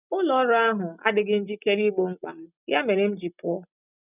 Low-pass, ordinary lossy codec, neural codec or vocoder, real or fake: 3.6 kHz; none; none; real